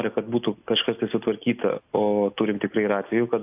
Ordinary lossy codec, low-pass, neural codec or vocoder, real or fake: AAC, 32 kbps; 3.6 kHz; none; real